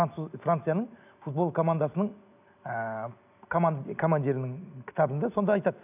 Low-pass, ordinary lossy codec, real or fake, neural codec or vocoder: 3.6 kHz; none; real; none